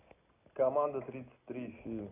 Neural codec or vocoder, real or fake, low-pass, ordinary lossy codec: none; real; 3.6 kHz; AAC, 24 kbps